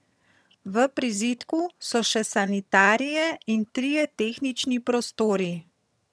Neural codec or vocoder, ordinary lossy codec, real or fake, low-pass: vocoder, 22.05 kHz, 80 mel bands, HiFi-GAN; none; fake; none